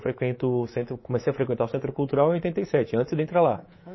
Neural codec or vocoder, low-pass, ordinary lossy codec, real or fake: codec, 24 kHz, 3.1 kbps, DualCodec; 7.2 kHz; MP3, 24 kbps; fake